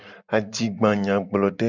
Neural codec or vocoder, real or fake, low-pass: none; real; 7.2 kHz